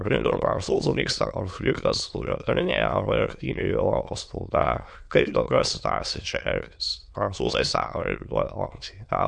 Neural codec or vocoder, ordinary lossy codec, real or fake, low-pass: autoencoder, 22.05 kHz, a latent of 192 numbers a frame, VITS, trained on many speakers; MP3, 64 kbps; fake; 9.9 kHz